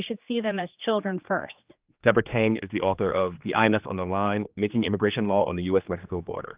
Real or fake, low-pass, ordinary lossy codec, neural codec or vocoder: fake; 3.6 kHz; Opus, 24 kbps; codec, 16 kHz, 2 kbps, X-Codec, HuBERT features, trained on general audio